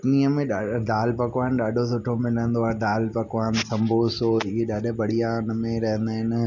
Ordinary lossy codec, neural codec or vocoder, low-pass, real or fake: none; none; 7.2 kHz; real